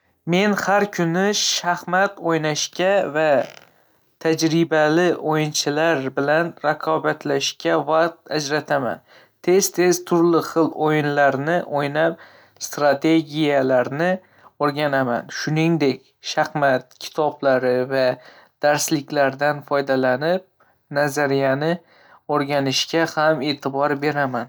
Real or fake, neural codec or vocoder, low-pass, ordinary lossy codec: real; none; none; none